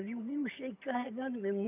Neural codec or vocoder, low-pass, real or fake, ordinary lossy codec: vocoder, 22.05 kHz, 80 mel bands, HiFi-GAN; 3.6 kHz; fake; none